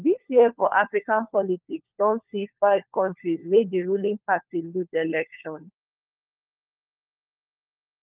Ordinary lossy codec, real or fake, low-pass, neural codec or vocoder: Opus, 32 kbps; fake; 3.6 kHz; codec, 16 kHz, 4 kbps, FunCodec, trained on LibriTTS, 50 frames a second